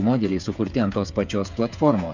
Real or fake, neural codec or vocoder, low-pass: fake; codec, 16 kHz, 8 kbps, FreqCodec, smaller model; 7.2 kHz